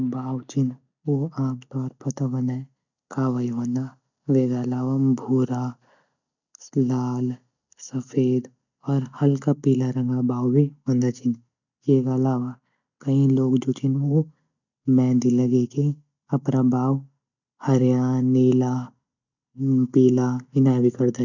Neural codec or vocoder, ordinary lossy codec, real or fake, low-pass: none; none; real; 7.2 kHz